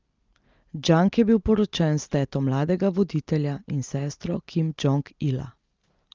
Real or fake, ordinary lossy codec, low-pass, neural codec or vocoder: real; Opus, 24 kbps; 7.2 kHz; none